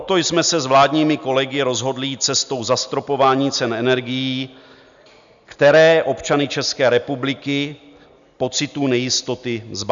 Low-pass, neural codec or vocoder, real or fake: 7.2 kHz; none; real